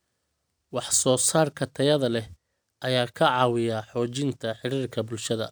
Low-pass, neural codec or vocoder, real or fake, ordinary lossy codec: none; none; real; none